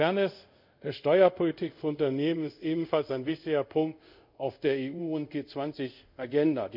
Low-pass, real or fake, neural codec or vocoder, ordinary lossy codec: 5.4 kHz; fake; codec, 24 kHz, 0.5 kbps, DualCodec; none